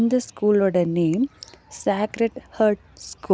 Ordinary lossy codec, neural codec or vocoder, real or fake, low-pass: none; none; real; none